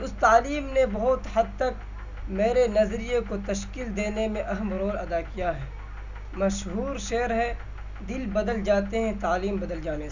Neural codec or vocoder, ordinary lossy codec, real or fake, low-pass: none; none; real; 7.2 kHz